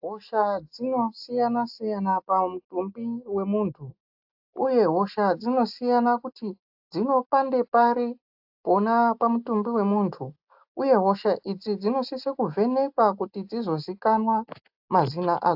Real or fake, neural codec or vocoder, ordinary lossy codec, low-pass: real; none; AAC, 48 kbps; 5.4 kHz